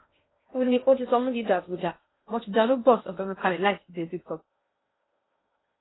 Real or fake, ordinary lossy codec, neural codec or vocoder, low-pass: fake; AAC, 16 kbps; codec, 16 kHz in and 24 kHz out, 0.6 kbps, FocalCodec, streaming, 2048 codes; 7.2 kHz